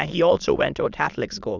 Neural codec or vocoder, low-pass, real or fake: autoencoder, 22.05 kHz, a latent of 192 numbers a frame, VITS, trained on many speakers; 7.2 kHz; fake